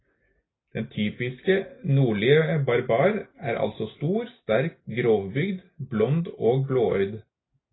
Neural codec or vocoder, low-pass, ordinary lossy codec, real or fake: none; 7.2 kHz; AAC, 16 kbps; real